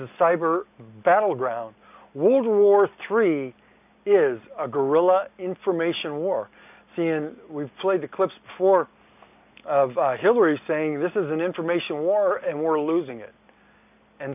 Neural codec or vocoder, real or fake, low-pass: none; real; 3.6 kHz